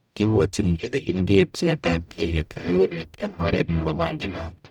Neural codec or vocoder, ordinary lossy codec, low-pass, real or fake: codec, 44.1 kHz, 0.9 kbps, DAC; none; 19.8 kHz; fake